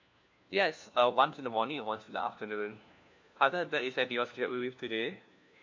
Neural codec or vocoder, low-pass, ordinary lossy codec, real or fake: codec, 16 kHz, 1 kbps, FunCodec, trained on LibriTTS, 50 frames a second; 7.2 kHz; MP3, 48 kbps; fake